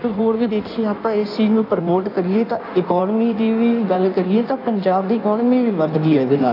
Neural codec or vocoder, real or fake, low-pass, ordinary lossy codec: codec, 16 kHz in and 24 kHz out, 1.1 kbps, FireRedTTS-2 codec; fake; 5.4 kHz; AAC, 32 kbps